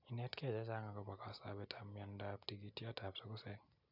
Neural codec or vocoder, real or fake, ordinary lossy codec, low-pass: none; real; none; 5.4 kHz